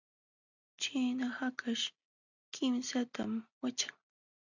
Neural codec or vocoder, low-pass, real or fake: none; 7.2 kHz; real